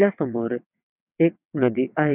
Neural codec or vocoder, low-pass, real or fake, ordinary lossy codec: vocoder, 22.05 kHz, 80 mel bands, WaveNeXt; 3.6 kHz; fake; none